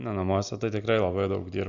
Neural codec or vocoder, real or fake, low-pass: none; real; 7.2 kHz